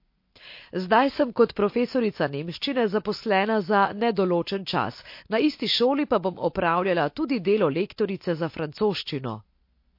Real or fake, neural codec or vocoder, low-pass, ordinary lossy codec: real; none; 5.4 kHz; MP3, 32 kbps